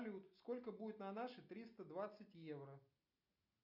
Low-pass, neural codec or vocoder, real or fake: 5.4 kHz; none; real